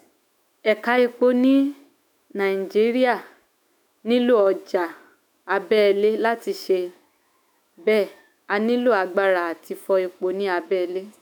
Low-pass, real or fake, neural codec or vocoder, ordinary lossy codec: none; fake; autoencoder, 48 kHz, 128 numbers a frame, DAC-VAE, trained on Japanese speech; none